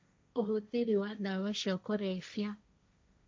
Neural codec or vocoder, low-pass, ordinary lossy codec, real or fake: codec, 16 kHz, 1.1 kbps, Voila-Tokenizer; none; none; fake